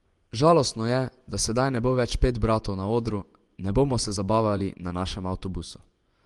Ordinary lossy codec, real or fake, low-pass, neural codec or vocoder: Opus, 24 kbps; fake; 10.8 kHz; vocoder, 24 kHz, 100 mel bands, Vocos